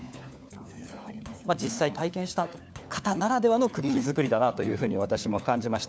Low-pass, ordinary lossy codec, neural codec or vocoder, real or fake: none; none; codec, 16 kHz, 4 kbps, FunCodec, trained on LibriTTS, 50 frames a second; fake